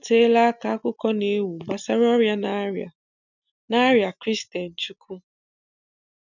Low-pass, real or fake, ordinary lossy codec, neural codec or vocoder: 7.2 kHz; real; none; none